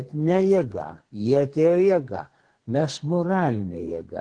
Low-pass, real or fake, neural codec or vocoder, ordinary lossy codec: 9.9 kHz; fake; codec, 32 kHz, 1.9 kbps, SNAC; Opus, 16 kbps